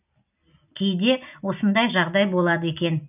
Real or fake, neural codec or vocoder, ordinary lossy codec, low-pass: real; none; none; 3.6 kHz